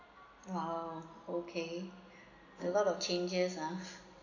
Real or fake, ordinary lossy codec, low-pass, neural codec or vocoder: real; none; 7.2 kHz; none